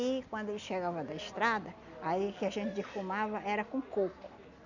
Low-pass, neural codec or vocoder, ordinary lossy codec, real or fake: 7.2 kHz; none; none; real